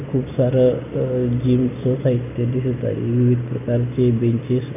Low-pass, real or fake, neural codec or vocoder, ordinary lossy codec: 3.6 kHz; real; none; none